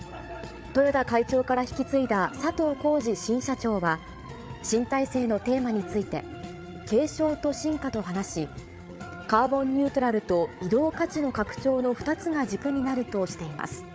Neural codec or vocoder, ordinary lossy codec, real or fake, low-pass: codec, 16 kHz, 8 kbps, FreqCodec, larger model; none; fake; none